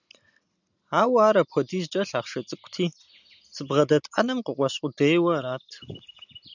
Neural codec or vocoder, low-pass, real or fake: none; 7.2 kHz; real